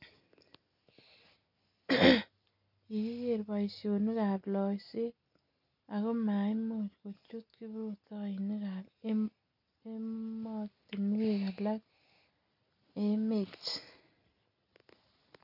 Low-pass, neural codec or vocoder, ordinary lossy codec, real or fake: 5.4 kHz; none; none; real